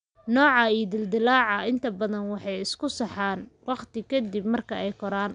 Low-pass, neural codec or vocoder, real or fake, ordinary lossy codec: 9.9 kHz; none; real; none